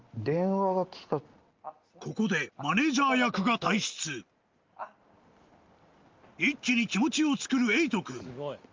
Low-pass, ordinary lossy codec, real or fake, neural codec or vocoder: 7.2 kHz; Opus, 32 kbps; real; none